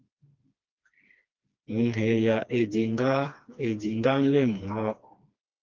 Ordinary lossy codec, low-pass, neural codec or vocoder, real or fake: Opus, 32 kbps; 7.2 kHz; codec, 16 kHz, 2 kbps, FreqCodec, smaller model; fake